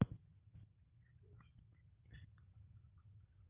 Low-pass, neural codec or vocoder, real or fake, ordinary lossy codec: 3.6 kHz; none; real; Opus, 32 kbps